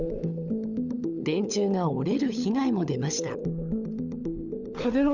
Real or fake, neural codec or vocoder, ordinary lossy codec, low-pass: fake; codec, 16 kHz, 16 kbps, FunCodec, trained on LibriTTS, 50 frames a second; none; 7.2 kHz